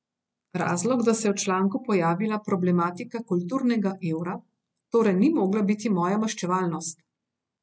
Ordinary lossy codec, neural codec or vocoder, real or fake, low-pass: none; none; real; none